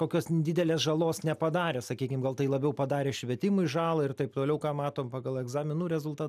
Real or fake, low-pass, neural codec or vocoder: real; 14.4 kHz; none